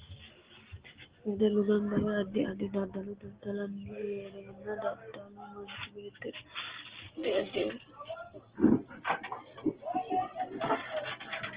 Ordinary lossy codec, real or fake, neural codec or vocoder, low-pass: Opus, 16 kbps; real; none; 3.6 kHz